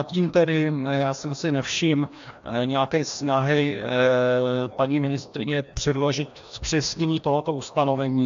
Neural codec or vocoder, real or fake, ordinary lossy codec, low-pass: codec, 16 kHz, 1 kbps, FreqCodec, larger model; fake; AAC, 64 kbps; 7.2 kHz